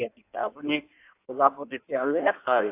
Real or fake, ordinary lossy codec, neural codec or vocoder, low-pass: fake; AAC, 24 kbps; codec, 16 kHz in and 24 kHz out, 1.1 kbps, FireRedTTS-2 codec; 3.6 kHz